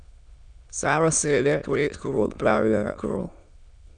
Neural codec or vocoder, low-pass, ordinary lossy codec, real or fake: autoencoder, 22.05 kHz, a latent of 192 numbers a frame, VITS, trained on many speakers; 9.9 kHz; none; fake